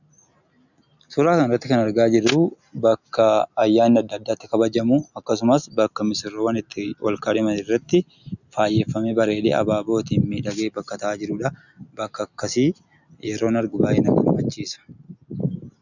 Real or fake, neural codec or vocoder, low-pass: real; none; 7.2 kHz